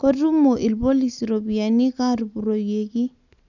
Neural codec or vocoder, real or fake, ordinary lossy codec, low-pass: none; real; none; 7.2 kHz